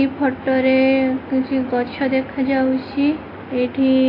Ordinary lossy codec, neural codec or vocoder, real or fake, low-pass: AAC, 24 kbps; none; real; 5.4 kHz